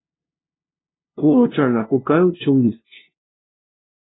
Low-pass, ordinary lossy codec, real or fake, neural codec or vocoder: 7.2 kHz; AAC, 16 kbps; fake; codec, 16 kHz, 0.5 kbps, FunCodec, trained on LibriTTS, 25 frames a second